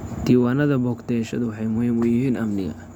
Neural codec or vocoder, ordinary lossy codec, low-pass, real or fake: none; none; 19.8 kHz; real